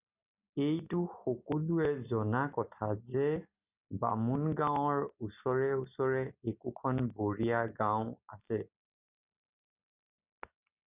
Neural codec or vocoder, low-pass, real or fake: none; 3.6 kHz; real